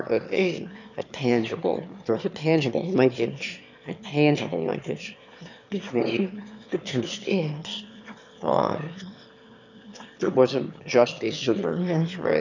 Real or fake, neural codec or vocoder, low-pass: fake; autoencoder, 22.05 kHz, a latent of 192 numbers a frame, VITS, trained on one speaker; 7.2 kHz